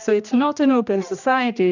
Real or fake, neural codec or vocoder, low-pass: fake; codec, 16 kHz, 1 kbps, X-Codec, HuBERT features, trained on general audio; 7.2 kHz